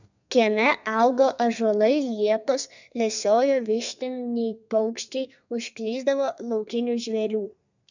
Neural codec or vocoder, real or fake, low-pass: codec, 32 kHz, 1.9 kbps, SNAC; fake; 7.2 kHz